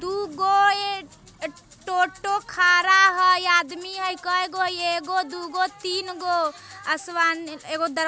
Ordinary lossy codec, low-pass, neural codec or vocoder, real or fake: none; none; none; real